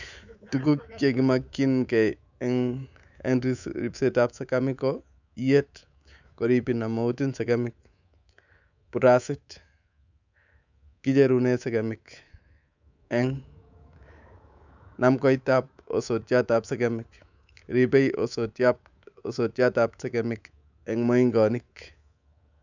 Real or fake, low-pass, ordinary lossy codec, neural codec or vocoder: fake; 7.2 kHz; none; codec, 24 kHz, 3.1 kbps, DualCodec